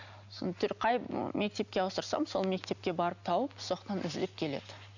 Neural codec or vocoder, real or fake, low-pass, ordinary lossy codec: none; real; 7.2 kHz; none